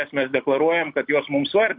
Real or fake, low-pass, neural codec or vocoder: real; 5.4 kHz; none